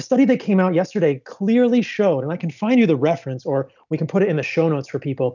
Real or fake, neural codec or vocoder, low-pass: real; none; 7.2 kHz